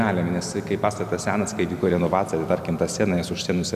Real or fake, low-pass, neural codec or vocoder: real; 14.4 kHz; none